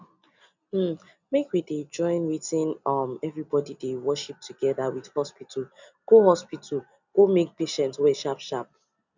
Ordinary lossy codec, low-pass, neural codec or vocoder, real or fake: none; 7.2 kHz; none; real